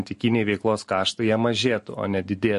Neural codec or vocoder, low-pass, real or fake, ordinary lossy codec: none; 14.4 kHz; real; MP3, 48 kbps